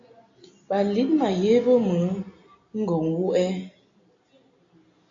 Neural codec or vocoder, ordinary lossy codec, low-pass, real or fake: none; AAC, 48 kbps; 7.2 kHz; real